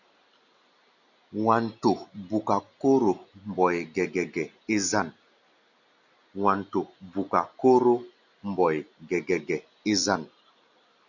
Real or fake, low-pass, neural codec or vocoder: real; 7.2 kHz; none